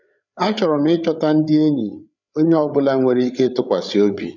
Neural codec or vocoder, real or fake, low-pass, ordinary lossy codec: codec, 16 kHz, 8 kbps, FreqCodec, larger model; fake; 7.2 kHz; none